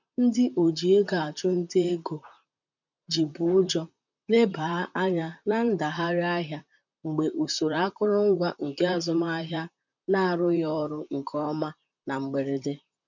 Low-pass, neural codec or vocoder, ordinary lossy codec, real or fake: 7.2 kHz; vocoder, 44.1 kHz, 128 mel bands, Pupu-Vocoder; none; fake